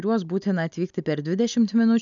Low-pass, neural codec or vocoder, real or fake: 7.2 kHz; none; real